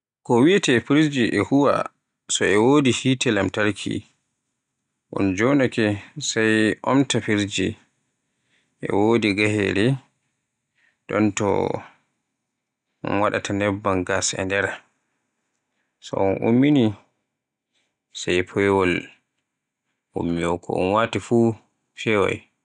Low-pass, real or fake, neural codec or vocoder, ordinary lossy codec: 9.9 kHz; real; none; none